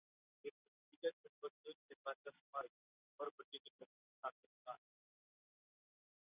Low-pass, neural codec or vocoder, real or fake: 3.6 kHz; none; real